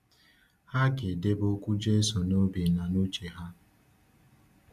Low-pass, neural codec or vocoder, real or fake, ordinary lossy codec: 14.4 kHz; none; real; none